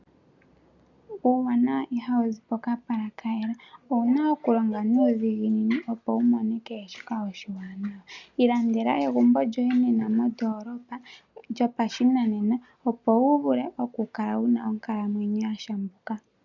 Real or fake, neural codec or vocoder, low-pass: real; none; 7.2 kHz